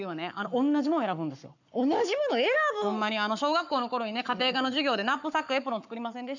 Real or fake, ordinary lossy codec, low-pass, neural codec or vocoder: fake; none; 7.2 kHz; codec, 44.1 kHz, 7.8 kbps, Pupu-Codec